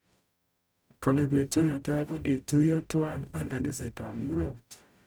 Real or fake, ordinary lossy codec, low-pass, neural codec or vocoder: fake; none; none; codec, 44.1 kHz, 0.9 kbps, DAC